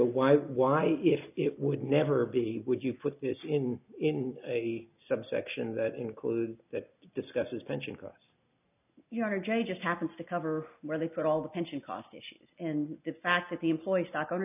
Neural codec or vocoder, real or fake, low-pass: none; real; 3.6 kHz